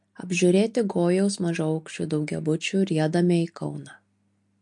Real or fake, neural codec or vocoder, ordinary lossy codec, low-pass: real; none; MP3, 48 kbps; 10.8 kHz